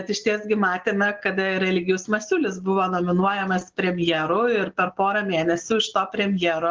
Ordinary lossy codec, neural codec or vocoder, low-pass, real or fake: Opus, 16 kbps; none; 7.2 kHz; real